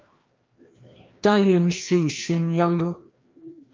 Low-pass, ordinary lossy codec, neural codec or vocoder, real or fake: 7.2 kHz; Opus, 24 kbps; codec, 16 kHz, 1 kbps, FreqCodec, larger model; fake